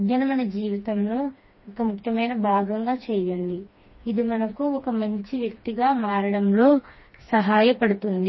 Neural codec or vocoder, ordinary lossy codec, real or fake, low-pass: codec, 16 kHz, 2 kbps, FreqCodec, smaller model; MP3, 24 kbps; fake; 7.2 kHz